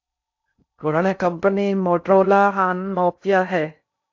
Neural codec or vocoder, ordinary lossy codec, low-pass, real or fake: codec, 16 kHz in and 24 kHz out, 0.6 kbps, FocalCodec, streaming, 4096 codes; AAC, 48 kbps; 7.2 kHz; fake